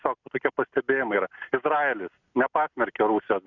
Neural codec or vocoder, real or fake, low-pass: none; real; 7.2 kHz